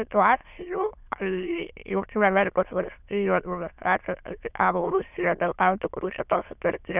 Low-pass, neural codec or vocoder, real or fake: 3.6 kHz; autoencoder, 22.05 kHz, a latent of 192 numbers a frame, VITS, trained on many speakers; fake